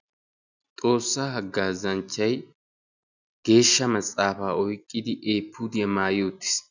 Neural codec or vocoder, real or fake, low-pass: none; real; 7.2 kHz